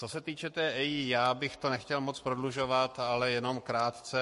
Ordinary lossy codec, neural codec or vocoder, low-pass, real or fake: MP3, 48 kbps; codec, 44.1 kHz, 7.8 kbps, Pupu-Codec; 14.4 kHz; fake